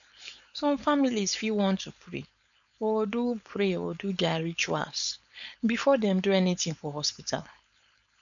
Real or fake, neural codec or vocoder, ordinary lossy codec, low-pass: fake; codec, 16 kHz, 4.8 kbps, FACodec; none; 7.2 kHz